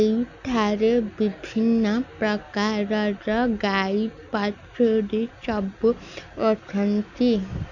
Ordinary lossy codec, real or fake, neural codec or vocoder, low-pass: none; real; none; 7.2 kHz